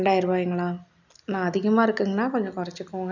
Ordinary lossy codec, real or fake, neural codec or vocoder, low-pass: none; real; none; 7.2 kHz